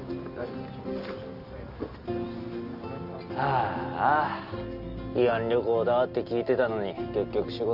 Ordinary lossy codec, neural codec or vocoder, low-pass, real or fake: Opus, 32 kbps; none; 5.4 kHz; real